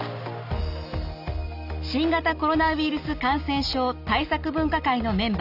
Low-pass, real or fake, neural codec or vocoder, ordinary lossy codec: 5.4 kHz; real; none; none